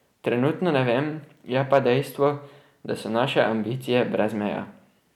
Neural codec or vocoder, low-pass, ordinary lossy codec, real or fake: none; 19.8 kHz; none; real